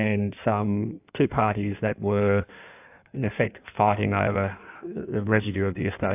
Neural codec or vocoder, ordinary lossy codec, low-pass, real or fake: codec, 16 kHz in and 24 kHz out, 1.1 kbps, FireRedTTS-2 codec; AAC, 32 kbps; 3.6 kHz; fake